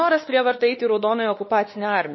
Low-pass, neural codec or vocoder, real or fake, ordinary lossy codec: 7.2 kHz; codec, 16 kHz, 2 kbps, X-Codec, WavLM features, trained on Multilingual LibriSpeech; fake; MP3, 24 kbps